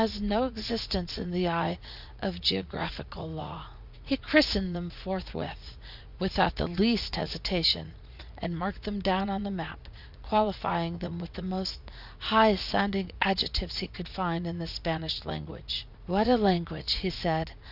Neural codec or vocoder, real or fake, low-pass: vocoder, 44.1 kHz, 80 mel bands, Vocos; fake; 5.4 kHz